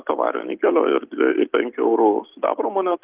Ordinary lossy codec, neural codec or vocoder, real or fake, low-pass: Opus, 32 kbps; none; real; 3.6 kHz